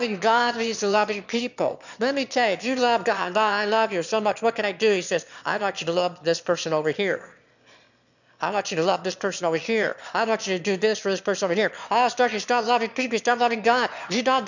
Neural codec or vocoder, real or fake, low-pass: autoencoder, 22.05 kHz, a latent of 192 numbers a frame, VITS, trained on one speaker; fake; 7.2 kHz